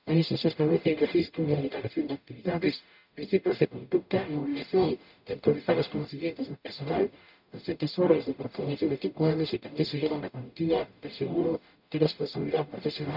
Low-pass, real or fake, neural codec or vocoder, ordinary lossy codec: 5.4 kHz; fake; codec, 44.1 kHz, 0.9 kbps, DAC; none